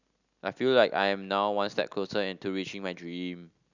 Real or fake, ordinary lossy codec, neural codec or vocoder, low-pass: real; none; none; 7.2 kHz